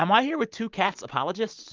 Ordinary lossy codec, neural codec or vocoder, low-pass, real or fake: Opus, 24 kbps; none; 7.2 kHz; real